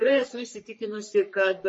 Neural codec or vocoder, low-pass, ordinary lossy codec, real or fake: codec, 44.1 kHz, 3.4 kbps, Pupu-Codec; 10.8 kHz; MP3, 32 kbps; fake